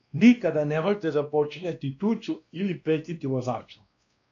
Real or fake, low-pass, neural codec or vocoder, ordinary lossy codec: fake; 7.2 kHz; codec, 16 kHz, 1 kbps, X-Codec, WavLM features, trained on Multilingual LibriSpeech; AAC, 64 kbps